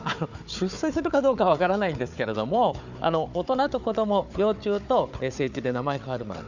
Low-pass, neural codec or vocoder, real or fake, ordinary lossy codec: 7.2 kHz; codec, 16 kHz, 4 kbps, FunCodec, trained on Chinese and English, 50 frames a second; fake; none